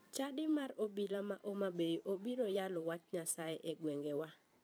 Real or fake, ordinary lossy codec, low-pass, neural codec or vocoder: fake; none; none; vocoder, 44.1 kHz, 128 mel bands every 512 samples, BigVGAN v2